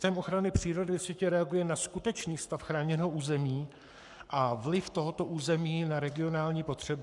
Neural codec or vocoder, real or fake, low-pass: codec, 44.1 kHz, 7.8 kbps, Pupu-Codec; fake; 10.8 kHz